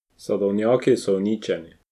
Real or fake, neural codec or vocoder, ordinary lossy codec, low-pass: real; none; none; 14.4 kHz